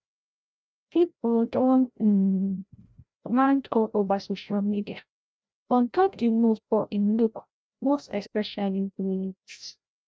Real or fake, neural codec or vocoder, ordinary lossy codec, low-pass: fake; codec, 16 kHz, 0.5 kbps, FreqCodec, larger model; none; none